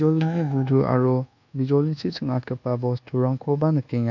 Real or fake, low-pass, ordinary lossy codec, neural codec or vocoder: fake; 7.2 kHz; none; codec, 24 kHz, 1.2 kbps, DualCodec